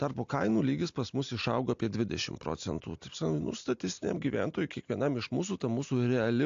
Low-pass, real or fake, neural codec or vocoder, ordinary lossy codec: 7.2 kHz; real; none; AAC, 64 kbps